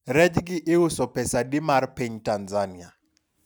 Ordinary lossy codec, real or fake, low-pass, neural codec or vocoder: none; real; none; none